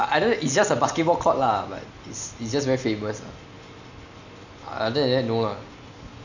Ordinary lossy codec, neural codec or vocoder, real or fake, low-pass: none; none; real; 7.2 kHz